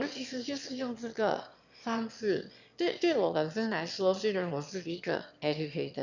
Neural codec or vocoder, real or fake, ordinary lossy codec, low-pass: autoencoder, 22.05 kHz, a latent of 192 numbers a frame, VITS, trained on one speaker; fake; none; 7.2 kHz